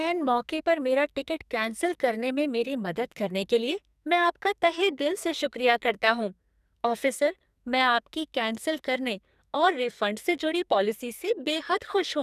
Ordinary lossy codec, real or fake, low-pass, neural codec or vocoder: none; fake; 14.4 kHz; codec, 44.1 kHz, 2.6 kbps, SNAC